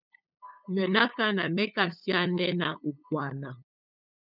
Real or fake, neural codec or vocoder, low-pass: fake; codec, 16 kHz, 8 kbps, FunCodec, trained on LibriTTS, 25 frames a second; 5.4 kHz